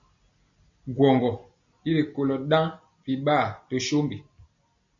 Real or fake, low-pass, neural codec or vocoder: real; 7.2 kHz; none